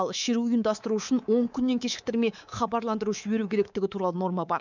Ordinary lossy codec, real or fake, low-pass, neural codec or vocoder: none; fake; 7.2 kHz; codec, 24 kHz, 3.1 kbps, DualCodec